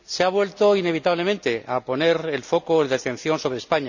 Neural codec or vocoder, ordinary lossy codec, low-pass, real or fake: none; none; 7.2 kHz; real